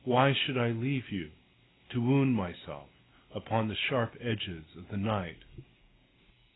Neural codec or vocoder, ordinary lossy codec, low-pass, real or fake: none; AAC, 16 kbps; 7.2 kHz; real